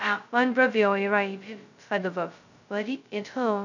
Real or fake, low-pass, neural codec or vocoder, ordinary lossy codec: fake; 7.2 kHz; codec, 16 kHz, 0.2 kbps, FocalCodec; none